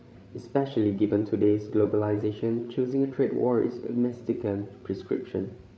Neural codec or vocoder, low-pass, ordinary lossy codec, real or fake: codec, 16 kHz, 8 kbps, FreqCodec, larger model; none; none; fake